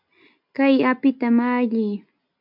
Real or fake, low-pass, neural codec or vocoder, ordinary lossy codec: real; 5.4 kHz; none; MP3, 48 kbps